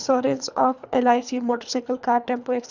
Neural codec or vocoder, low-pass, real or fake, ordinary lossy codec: codec, 24 kHz, 3 kbps, HILCodec; 7.2 kHz; fake; none